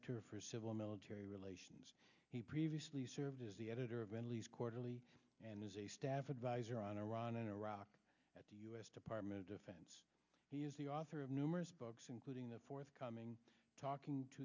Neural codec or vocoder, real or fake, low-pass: none; real; 7.2 kHz